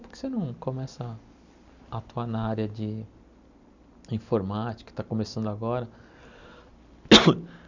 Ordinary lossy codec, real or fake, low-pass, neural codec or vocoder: Opus, 64 kbps; real; 7.2 kHz; none